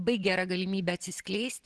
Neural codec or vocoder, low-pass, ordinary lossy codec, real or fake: none; 10.8 kHz; Opus, 16 kbps; real